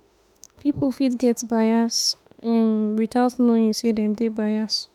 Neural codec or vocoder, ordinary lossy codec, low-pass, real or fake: autoencoder, 48 kHz, 32 numbers a frame, DAC-VAE, trained on Japanese speech; none; 19.8 kHz; fake